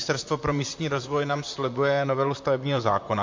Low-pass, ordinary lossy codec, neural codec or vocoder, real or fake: 7.2 kHz; MP3, 48 kbps; vocoder, 44.1 kHz, 128 mel bands, Pupu-Vocoder; fake